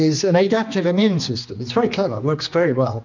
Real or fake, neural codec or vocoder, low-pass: fake; codec, 16 kHz, 2 kbps, X-Codec, HuBERT features, trained on general audio; 7.2 kHz